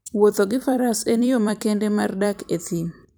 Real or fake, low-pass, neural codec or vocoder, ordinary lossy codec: fake; none; vocoder, 44.1 kHz, 128 mel bands every 256 samples, BigVGAN v2; none